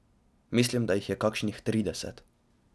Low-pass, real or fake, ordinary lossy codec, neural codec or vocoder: none; real; none; none